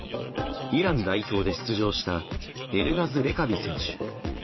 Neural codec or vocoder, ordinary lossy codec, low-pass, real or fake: vocoder, 22.05 kHz, 80 mel bands, Vocos; MP3, 24 kbps; 7.2 kHz; fake